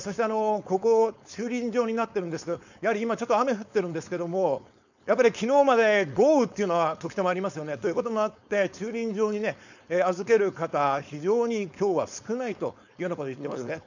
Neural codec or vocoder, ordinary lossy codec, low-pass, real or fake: codec, 16 kHz, 4.8 kbps, FACodec; none; 7.2 kHz; fake